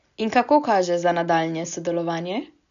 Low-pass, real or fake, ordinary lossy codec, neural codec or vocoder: 7.2 kHz; real; none; none